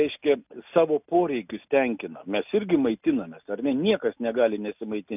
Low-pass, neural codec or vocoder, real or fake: 3.6 kHz; none; real